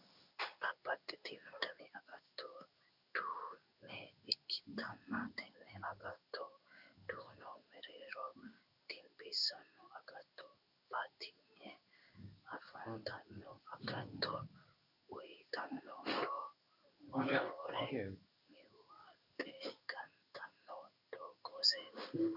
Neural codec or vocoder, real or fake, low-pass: codec, 16 kHz in and 24 kHz out, 1 kbps, XY-Tokenizer; fake; 5.4 kHz